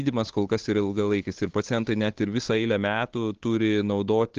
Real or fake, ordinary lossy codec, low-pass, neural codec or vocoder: fake; Opus, 16 kbps; 7.2 kHz; codec, 16 kHz, 8 kbps, FunCodec, trained on Chinese and English, 25 frames a second